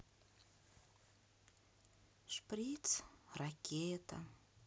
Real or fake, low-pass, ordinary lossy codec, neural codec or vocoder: real; none; none; none